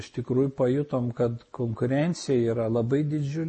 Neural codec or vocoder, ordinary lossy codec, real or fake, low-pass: none; MP3, 32 kbps; real; 10.8 kHz